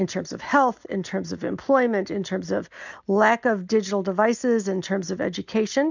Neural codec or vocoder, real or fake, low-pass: none; real; 7.2 kHz